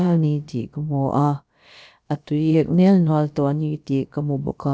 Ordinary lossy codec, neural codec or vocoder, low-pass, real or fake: none; codec, 16 kHz, about 1 kbps, DyCAST, with the encoder's durations; none; fake